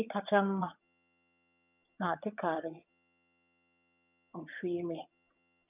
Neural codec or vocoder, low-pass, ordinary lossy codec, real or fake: vocoder, 22.05 kHz, 80 mel bands, HiFi-GAN; 3.6 kHz; none; fake